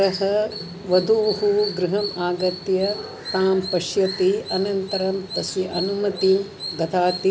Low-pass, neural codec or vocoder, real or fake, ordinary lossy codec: none; none; real; none